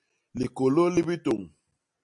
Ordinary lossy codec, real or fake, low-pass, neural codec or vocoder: MP3, 48 kbps; real; 10.8 kHz; none